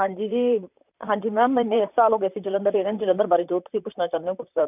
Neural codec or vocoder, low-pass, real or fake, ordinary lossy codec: vocoder, 44.1 kHz, 128 mel bands, Pupu-Vocoder; 3.6 kHz; fake; none